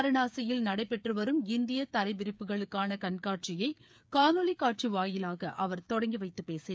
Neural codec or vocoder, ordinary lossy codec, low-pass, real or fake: codec, 16 kHz, 8 kbps, FreqCodec, smaller model; none; none; fake